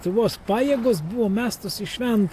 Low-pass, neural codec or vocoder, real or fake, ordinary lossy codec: 14.4 kHz; none; real; AAC, 64 kbps